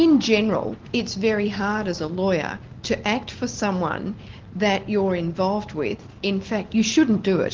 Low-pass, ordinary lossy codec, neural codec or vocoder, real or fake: 7.2 kHz; Opus, 16 kbps; none; real